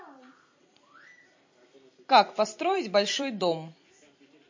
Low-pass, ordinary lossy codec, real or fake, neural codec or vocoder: 7.2 kHz; MP3, 32 kbps; fake; vocoder, 44.1 kHz, 128 mel bands every 256 samples, BigVGAN v2